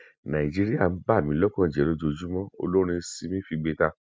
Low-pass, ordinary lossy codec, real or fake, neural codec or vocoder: none; none; real; none